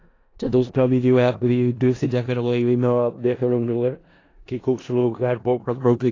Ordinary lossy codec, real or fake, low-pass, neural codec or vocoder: AAC, 32 kbps; fake; 7.2 kHz; codec, 16 kHz in and 24 kHz out, 0.4 kbps, LongCat-Audio-Codec, four codebook decoder